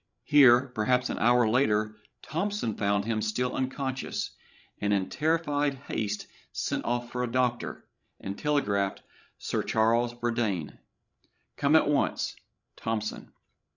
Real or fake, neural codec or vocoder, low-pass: fake; codec, 16 kHz, 16 kbps, FreqCodec, larger model; 7.2 kHz